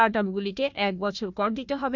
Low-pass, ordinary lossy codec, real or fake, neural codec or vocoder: 7.2 kHz; none; fake; codec, 16 kHz, 1 kbps, FunCodec, trained on Chinese and English, 50 frames a second